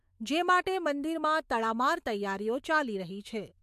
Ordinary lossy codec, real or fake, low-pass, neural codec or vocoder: MP3, 64 kbps; fake; 14.4 kHz; autoencoder, 48 kHz, 128 numbers a frame, DAC-VAE, trained on Japanese speech